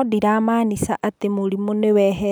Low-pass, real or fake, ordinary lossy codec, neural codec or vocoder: none; real; none; none